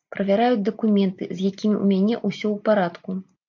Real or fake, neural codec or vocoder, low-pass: real; none; 7.2 kHz